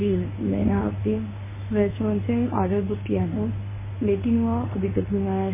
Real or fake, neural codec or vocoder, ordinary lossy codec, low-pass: fake; codec, 24 kHz, 0.9 kbps, WavTokenizer, medium speech release version 1; MP3, 16 kbps; 3.6 kHz